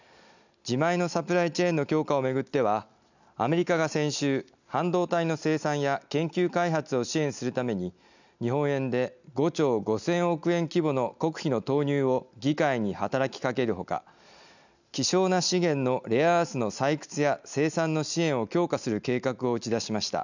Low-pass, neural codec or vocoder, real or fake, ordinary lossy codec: 7.2 kHz; none; real; none